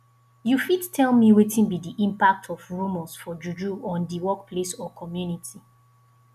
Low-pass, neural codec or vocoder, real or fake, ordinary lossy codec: 14.4 kHz; none; real; none